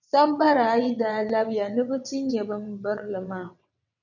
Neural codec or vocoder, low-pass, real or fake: vocoder, 22.05 kHz, 80 mel bands, WaveNeXt; 7.2 kHz; fake